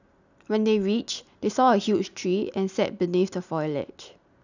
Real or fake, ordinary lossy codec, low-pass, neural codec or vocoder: real; none; 7.2 kHz; none